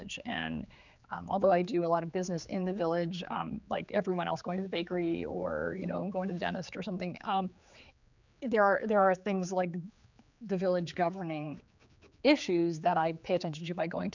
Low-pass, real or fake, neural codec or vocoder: 7.2 kHz; fake; codec, 16 kHz, 4 kbps, X-Codec, HuBERT features, trained on general audio